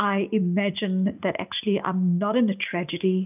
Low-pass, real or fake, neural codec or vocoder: 3.6 kHz; real; none